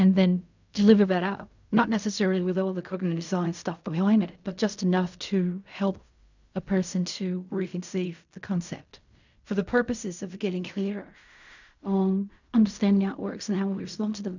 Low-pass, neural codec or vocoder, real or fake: 7.2 kHz; codec, 16 kHz in and 24 kHz out, 0.4 kbps, LongCat-Audio-Codec, fine tuned four codebook decoder; fake